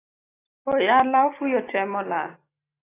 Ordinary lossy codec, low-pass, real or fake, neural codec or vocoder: AAC, 16 kbps; 3.6 kHz; real; none